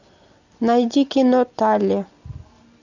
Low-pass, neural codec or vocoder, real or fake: 7.2 kHz; none; real